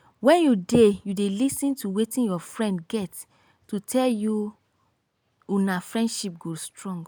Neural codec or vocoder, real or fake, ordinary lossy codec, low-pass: none; real; none; none